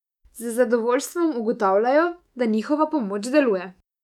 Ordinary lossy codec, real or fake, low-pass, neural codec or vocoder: none; fake; 19.8 kHz; autoencoder, 48 kHz, 128 numbers a frame, DAC-VAE, trained on Japanese speech